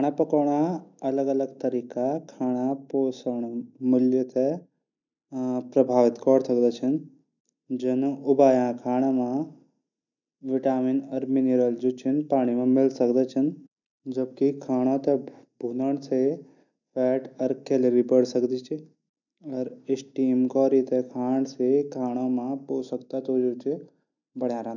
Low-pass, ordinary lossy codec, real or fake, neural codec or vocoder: 7.2 kHz; none; real; none